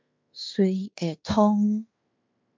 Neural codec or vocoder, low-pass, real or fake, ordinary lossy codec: codec, 16 kHz in and 24 kHz out, 0.9 kbps, LongCat-Audio-Codec, fine tuned four codebook decoder; 7.2 kHz; fake; MP3, 64 kbps